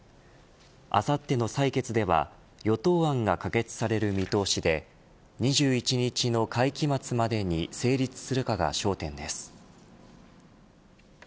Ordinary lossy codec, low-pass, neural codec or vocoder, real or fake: none; none; none; real